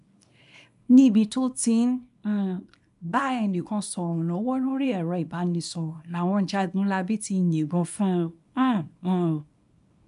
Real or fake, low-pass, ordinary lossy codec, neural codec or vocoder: fake; 10.8 kHz; MP3, 96 kbps; codec, 24 kHz, 0.9 kbps, WavTokenizer, small release